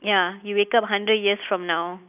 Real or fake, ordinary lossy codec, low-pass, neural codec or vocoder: real; none; 3.6 kHz; none